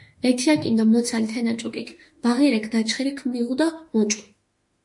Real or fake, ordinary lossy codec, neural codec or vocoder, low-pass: fake; MP3, 48 kbps; autoencoder, 48 kHz, 32 numbers a frame, DAC-VAE, trained on Japanese speech; 10.8 kHz